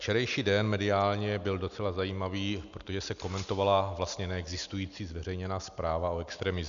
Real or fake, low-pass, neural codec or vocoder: real; 7.2 kHz; none